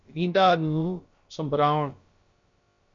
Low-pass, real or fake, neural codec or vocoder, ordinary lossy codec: 7.2 kHz; fake; codec, 16 kHz, about 1 kbps, DyCAST, with the encoder's durations; MP3, 48 kbps